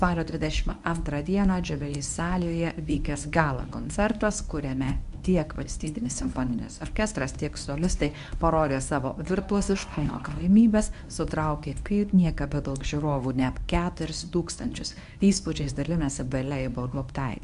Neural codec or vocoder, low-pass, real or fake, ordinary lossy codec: codec, 24 kHz, 0.9 kbps, WavTokenizer, medium speech release version 1; 10.8 kHz; fake; AAC, 96 kbps